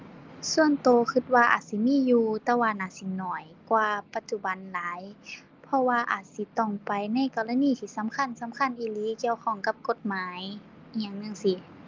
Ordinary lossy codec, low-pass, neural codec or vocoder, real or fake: Opus, 32 kbps; 7.2 kHz; none; real